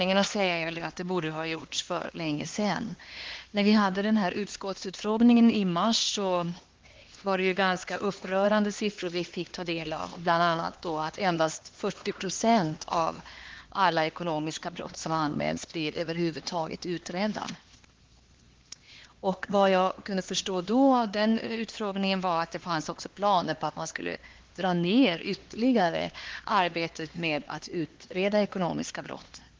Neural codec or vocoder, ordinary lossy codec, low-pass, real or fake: codec, 16 kHz, 2 kbps, X-Codec, HuBERT features, trained on LibriSpeech; Opus, 16 kbps; 7.2 kHz; fake